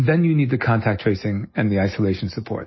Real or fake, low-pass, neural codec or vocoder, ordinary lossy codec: real; 7.2 kHz; none; MP3, 24 kbps